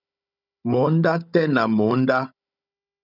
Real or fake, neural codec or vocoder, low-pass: fake; codec, 16 kHz, 16 kbps, FunCodec, trained on Chinese and English, 50 frames a second; 5.4 kHz